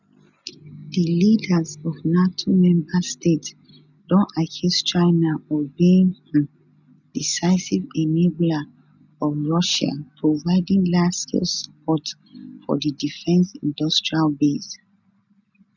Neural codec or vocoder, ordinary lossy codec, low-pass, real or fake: none; none; 7.2 kHz; real